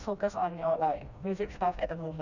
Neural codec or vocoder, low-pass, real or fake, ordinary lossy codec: codec, 16 kHz, 1 kbps, FreqCodec, smaller model; 7.2 kHz; fake; none